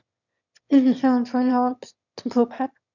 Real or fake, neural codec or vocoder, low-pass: fake; autoencoder, 22.05 kHz, a latent of 192 numbers a frame, VITS, trained on one speaker; 7.2 kHz